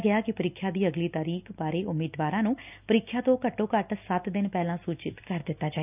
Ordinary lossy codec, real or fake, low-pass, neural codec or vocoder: none; real; 3.6 kHz; none